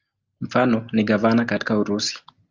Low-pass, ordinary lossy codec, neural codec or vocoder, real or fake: 7.2 kHz; Opus, 24 kbps; none; real